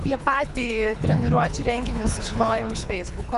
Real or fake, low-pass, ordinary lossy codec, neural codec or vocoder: fake; 10.8 kHz; MP3, 96 kbps; codec, 24 kHz, 3 kbps, HILCodec